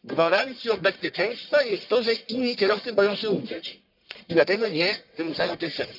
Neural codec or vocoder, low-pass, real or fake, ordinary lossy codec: codec, 44.1 kHz, 1.7 kbps, Pupu-Codec; 5.4 kHz; fake; none